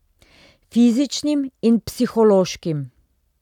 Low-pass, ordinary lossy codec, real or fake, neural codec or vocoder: 19.8 kHz; none; real; none